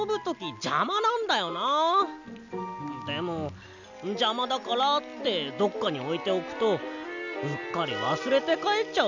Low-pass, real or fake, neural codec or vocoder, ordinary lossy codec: 7.2 kHz; real; none; none